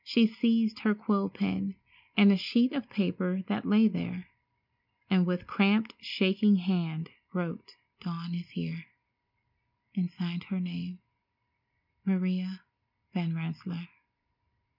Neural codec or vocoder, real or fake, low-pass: none; real; 5.4 kHz